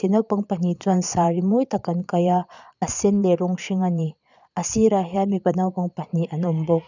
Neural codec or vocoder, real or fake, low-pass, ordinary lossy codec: vocoder, 22.05 kHz, 80 mel bands, Vocos; fake; 7.2 kHz; none